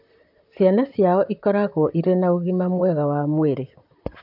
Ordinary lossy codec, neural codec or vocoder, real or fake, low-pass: AAC, 48 kbps; vocoder, 44.1 kHz, 128 mel bands, Pupu-Vocoder; fake; 5.4 kHz